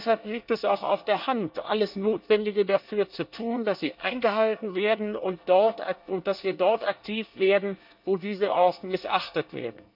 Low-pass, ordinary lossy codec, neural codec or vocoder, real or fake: 5.4 kHz; none; codec, 24 kHz, 1 kbps, SNAC; fake